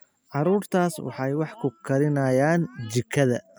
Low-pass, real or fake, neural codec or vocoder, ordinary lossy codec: none; real; none; none